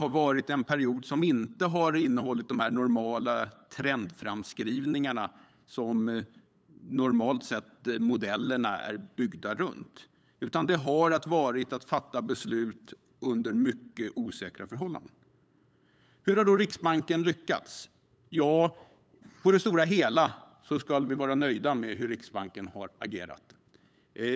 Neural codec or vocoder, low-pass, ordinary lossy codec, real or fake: codec, 16 kHz, 8 kbps, FunCodec, trained on LibriTTS, 25 frames a second; none; none; fake